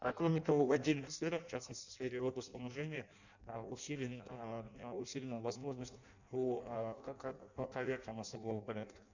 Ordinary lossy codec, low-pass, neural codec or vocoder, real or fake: none; 7.2 kHz; codec, 16 kHz in and 24 kHz out, 0.6 kbps, FireRedTTS-2 codec; fake